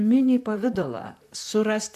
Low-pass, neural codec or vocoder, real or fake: 14.4 kHz; vocoder, 44.1 kHz, 128 mel bands, Pupu-Vocoder; fake